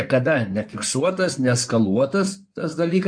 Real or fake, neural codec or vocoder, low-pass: fake; codec, 16 kHz in and 24 kHz out, 2.2 kbps, FireRedTTS-2 codec; 9.9 kHz